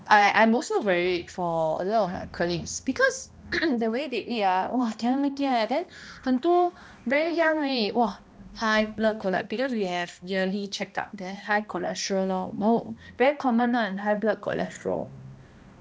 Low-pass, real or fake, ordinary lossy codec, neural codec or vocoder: none; fake; none; codec, 16 kHz, 1 kbps, X-Codec, HuBERT features, trained on balanced general audio